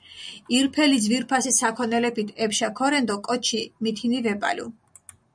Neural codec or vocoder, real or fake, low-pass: none; real; 9.9 kHz